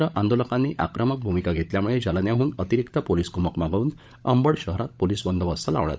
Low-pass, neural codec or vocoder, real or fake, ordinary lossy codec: none; codec, 16 kHz, 16 kbps, FunCodec, trained on LibriTTS, 50 frames a second; fake; none